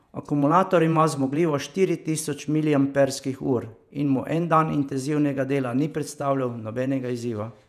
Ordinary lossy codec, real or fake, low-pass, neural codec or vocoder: none; fake; 14.4 kHz; vocoder, 48 kHz, 128 mel bands, Vocos